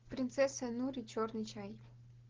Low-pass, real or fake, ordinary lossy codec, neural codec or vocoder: 7.2 kHz; real; Opus, 16 kbps; none